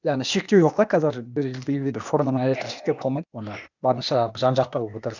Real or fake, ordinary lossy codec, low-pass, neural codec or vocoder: fake; none; 7.2 kHz; codec, 16 kHz, 0.8 kbps, ZipCodec